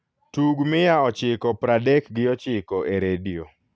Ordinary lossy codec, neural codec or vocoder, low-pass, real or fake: none; none; none; real